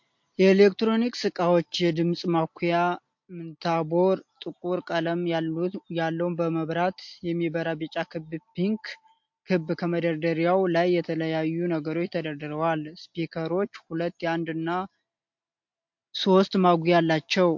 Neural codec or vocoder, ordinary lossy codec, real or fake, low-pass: none; MP3, 48 kbps; real; 7.2 kHz